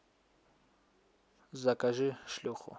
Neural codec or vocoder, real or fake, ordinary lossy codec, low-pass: none; real; none; none